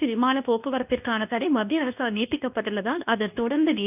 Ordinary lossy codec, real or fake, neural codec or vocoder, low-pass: none; fake; codec, 24 kHz, 0.9 kbps, WavTokenizer, medium speech release version 2; 3.6 kHz